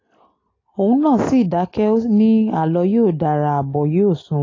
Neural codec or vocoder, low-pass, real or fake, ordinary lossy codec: none; 7.2 kHz; real; AAC, 32 kbps